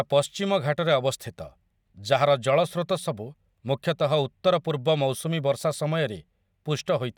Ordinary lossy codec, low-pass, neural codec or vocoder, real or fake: none; 19.8 kHz; none; real